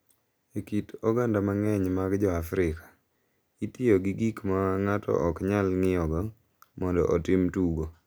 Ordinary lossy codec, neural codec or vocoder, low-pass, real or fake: none; none; none; real